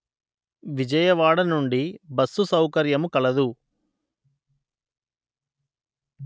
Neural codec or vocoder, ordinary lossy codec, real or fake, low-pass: none; none; real; none